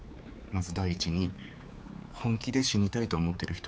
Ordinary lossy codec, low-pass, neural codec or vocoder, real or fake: none; none; codec, 16 kHz, 4 kbps, X-Codec, HuBERT features, trained on general audio; fake